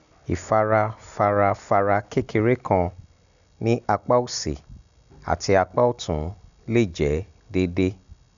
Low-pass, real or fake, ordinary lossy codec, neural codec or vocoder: 7.2 kHz; real; none; none